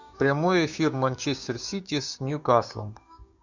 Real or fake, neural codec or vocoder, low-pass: fake; codec, 16 kHz, 6 kbps, DAC; 7.2 kHz